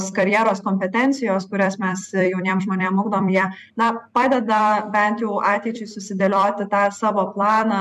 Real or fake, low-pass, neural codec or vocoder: fake; 14.4 kHz; vocoder, 44.1 kHz, 128 mel bands every 256 samples, BigVGAN v2